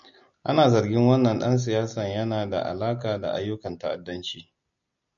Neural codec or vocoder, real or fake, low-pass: none; real; 7.2 kHz